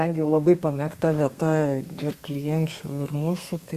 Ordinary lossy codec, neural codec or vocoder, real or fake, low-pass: Opus, 64 kbps; codec, 32 kHz, 1.9 kbps, SNAC; fake; 14.4 kHz